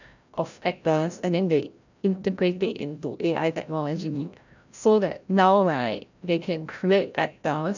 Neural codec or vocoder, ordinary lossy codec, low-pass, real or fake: codec, 16 kHz, 0.5 kbps, FreqCodec, larger model; none; 7.2 kHz; fake